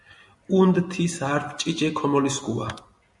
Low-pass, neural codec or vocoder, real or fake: 10.8 kHz; none; real